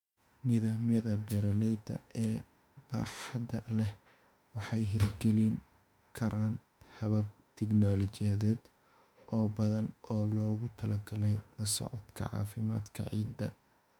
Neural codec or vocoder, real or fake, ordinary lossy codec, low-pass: autoencoder, 48 kHz, 32 numbers a frame, DAC-VAE, trained on Japanese speech; fake; MP3, 96 kbps; 19.8 kHz